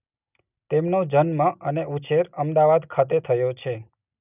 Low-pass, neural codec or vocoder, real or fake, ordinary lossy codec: 3.6 kHz; none; real; none